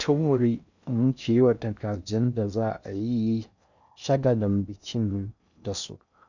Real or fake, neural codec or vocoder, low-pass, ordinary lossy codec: fake; codec, 16 kHz in and 24 kHz out, 0.6 kbps, FocalCodec, streaming, 4096 codes; 7.2 kHz; none